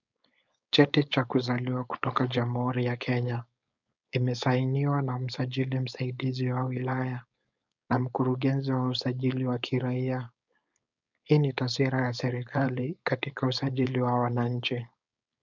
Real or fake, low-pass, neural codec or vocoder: fake; 7.2 kHz; codec, 16 kHz, 4.8 kbps, FACodec